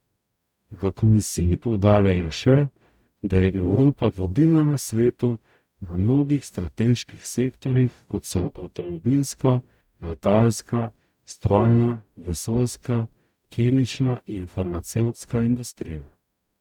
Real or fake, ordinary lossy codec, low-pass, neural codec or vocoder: fake; none; 19.8 kHz; codec, 44.1 kHz, 0.9 kbps, DAC